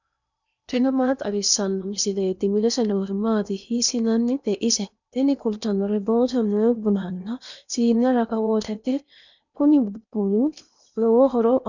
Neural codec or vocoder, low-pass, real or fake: codec, 16 kHz in and 24 kHz out, 0.8 kbps, FocalCodec, streaming, 65536 codes; 7.2 kHz; fake